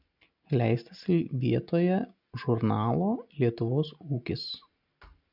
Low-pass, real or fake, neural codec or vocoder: 5.4 kHz; real; none